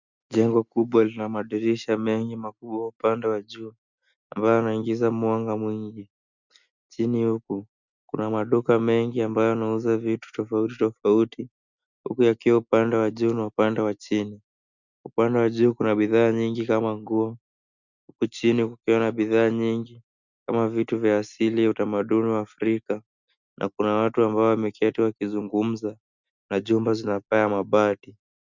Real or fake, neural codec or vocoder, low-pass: real; none; 7.2 kHz